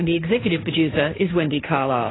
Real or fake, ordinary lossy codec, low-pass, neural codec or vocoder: fake; AAC, 16 kbps; 7.2 kHz; codec, 16 kHz, 1.1 kbps, Voila-Tokenizer